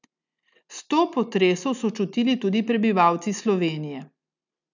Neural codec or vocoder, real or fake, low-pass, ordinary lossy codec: none; real; 7.2 kHz; none